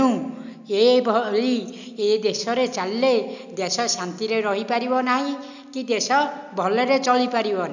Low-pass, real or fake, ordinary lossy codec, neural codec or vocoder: 7.2 kHz; real; none; none